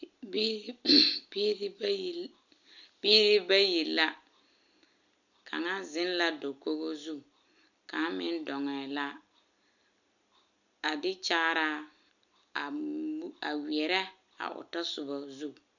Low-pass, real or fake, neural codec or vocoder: 7.2 kHz; real; none